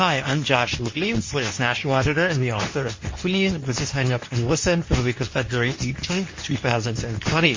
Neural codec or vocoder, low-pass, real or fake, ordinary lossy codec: codec, 24 kHz, 0.9 kbps, WavTokenizer, medium speech release version 2; 7.2 kHz; fake; MP3, 32 kbps